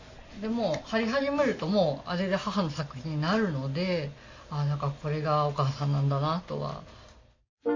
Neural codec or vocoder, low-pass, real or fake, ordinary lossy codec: none; 7.2 kHz; real; MP3, 32 kbps